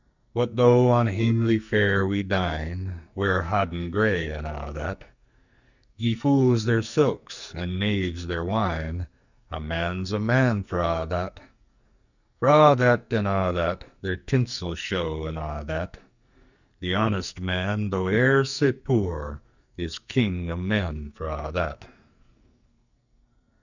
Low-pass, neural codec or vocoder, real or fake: 7.2 kHz; codec, 44.1 kHz, 2.6 kbps, SNAC; fake